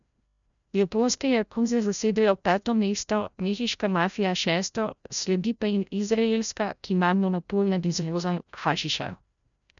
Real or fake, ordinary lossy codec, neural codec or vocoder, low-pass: fake; none; codec, 16 kHz, 0.5 kbps, FreqCodec, larger model; 7.2 kHz